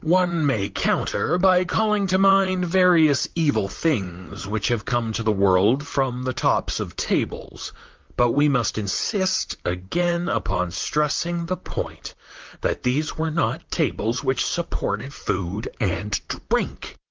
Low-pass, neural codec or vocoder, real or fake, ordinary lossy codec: 7.2 kHz; vocoder, 44.1 kHz, 128 mel bands, Pupu-Vocoder; fake; Opus, 32 kbps